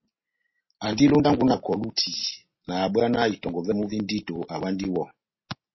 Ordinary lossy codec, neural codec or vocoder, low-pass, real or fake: MP3, 24 kbps; none; 7.2 kHz; real